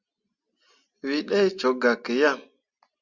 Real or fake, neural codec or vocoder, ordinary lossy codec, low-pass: real; none; Opus, 64 kbps; 7.2 kHz